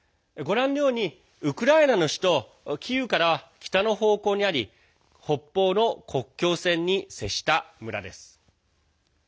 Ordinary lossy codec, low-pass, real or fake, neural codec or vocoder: none; none; real; none